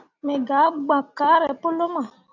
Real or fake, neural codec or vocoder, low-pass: real; none; 7.2 kHz